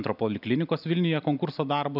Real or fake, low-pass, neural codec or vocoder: real; 5.4 kHz; none